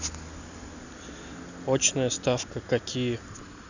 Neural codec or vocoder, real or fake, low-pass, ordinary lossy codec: none; real; 7.2 kHz; none